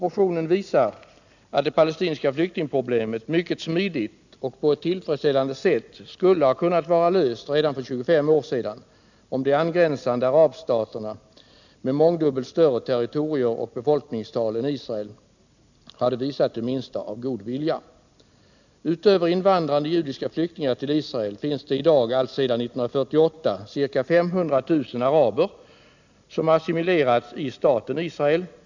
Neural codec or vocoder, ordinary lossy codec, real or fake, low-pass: none; none; real; 7.2 kHz